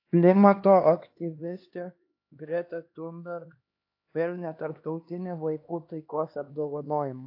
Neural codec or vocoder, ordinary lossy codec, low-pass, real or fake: codec, 16 kHz, 2 kbps, X-Codec, HuBERT features, trained on LibriSpeech; AAC, 32 kbps; 5.4 kHz; fake